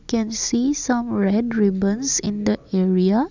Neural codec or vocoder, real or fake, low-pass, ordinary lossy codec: none; real; 7.2 kHz; none